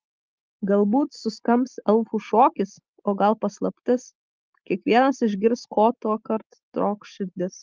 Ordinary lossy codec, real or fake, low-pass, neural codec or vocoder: Opus, 24 kbps; real; 7.2 kHz; none